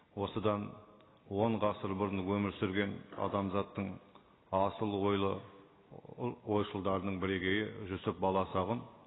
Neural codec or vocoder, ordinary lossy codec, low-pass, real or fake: none; AAC, 16 kbps; 7.2 kHz; real